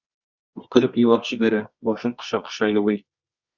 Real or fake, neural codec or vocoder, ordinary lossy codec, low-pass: fake; codec, 24 kHz, 0.9 kbps, WavTokenizer, medium music audio release; Opus, 64 kbps; 7.2 kHz